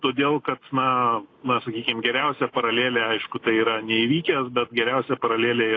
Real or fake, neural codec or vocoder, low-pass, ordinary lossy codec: real; none; 7.2 kHz; AAC, 32 kbps